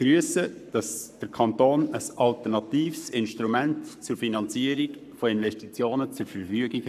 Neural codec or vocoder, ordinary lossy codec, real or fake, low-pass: codec, 44.1 kHz, 7.8 kbps, Pupu-Codec; none; fake; 14.4 kHz